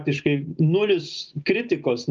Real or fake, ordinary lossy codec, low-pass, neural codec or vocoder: real; Opus, 32 kbps; 7.2 kHz; none